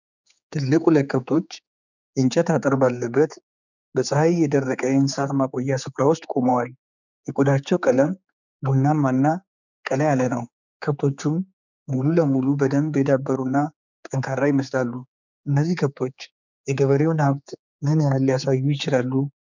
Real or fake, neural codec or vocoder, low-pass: fake; codec, 16 kHz, 4 kbps, X-Codec, HuBERT features, trained on general audio; 7.2 kHz